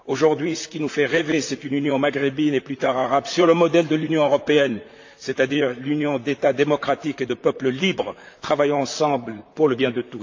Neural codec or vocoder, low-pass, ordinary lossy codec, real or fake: vocoder, 44.1 kHz, 128 mel bands, Pupu-Vocoder; 7.2 kHz; AAC, 48 kbps; fake